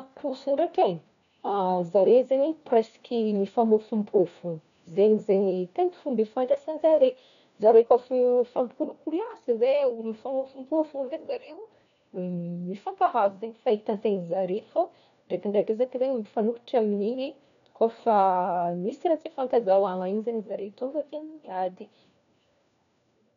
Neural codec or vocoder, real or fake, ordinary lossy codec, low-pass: codec, 16 kHz, 1 kbps, FunCodec, trained on LibriTTS, 50 frames a second; fake; none; 7.2 kHz